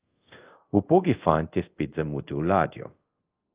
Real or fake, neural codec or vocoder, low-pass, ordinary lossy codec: fake; codec, 24 kHz, 0.5 kbps, DualCodec; 3.6 kHz; Opus, 24 kbps